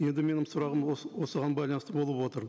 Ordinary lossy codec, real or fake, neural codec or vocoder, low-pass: none; real; none; none